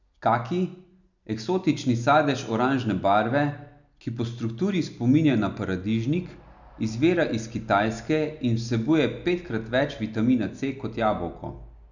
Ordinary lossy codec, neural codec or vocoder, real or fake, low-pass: none; none; real; 7.2 kHz